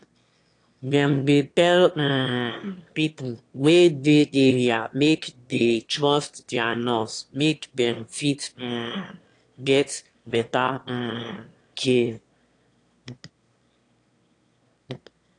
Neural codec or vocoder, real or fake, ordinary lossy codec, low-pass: autoencoder, 22.05 kHz, a latent of 192 numbers a frame, VITS, trained on one speaker; fake; AAC, 48 kbps; 9.9 kHz